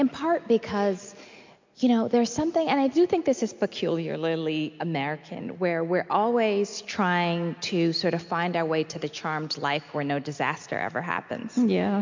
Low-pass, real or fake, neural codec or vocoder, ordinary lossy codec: 7.2 kHz; real; none; MP3, 48 kbps